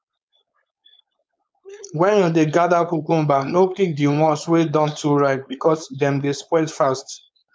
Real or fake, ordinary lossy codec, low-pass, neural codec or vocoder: fake; none; none; codec, 16 kHz, 4.8 kbps, FACodec